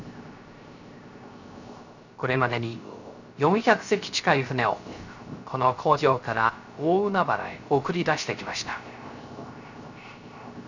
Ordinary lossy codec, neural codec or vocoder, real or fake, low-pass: none; codec, 16 kHz, 0.3 kbps, FocalCodec; fake; 7.2 kHz